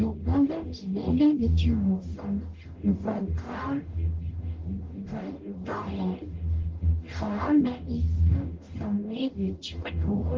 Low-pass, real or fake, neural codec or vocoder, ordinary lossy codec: 7.2 kHz; fake; codec, 44.1 kHz, 0.9 kbps, DAC; Opus, 16 kbps